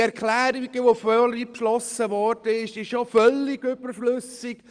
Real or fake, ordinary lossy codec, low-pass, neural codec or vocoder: real; Opus, 24 kbps; 9.9 kHz; none